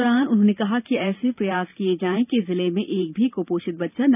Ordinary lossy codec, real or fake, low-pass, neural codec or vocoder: none; fake; 3.6 kHz; vocoder, 44.1 kHz, 128 mel bands every 512 samples, BigVGAN v2